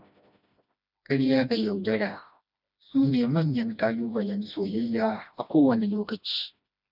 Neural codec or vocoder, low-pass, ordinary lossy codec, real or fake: codec, 16 kHz, 1 kbps, FreqCodec, smaller model; 5.4 kHz; none; fake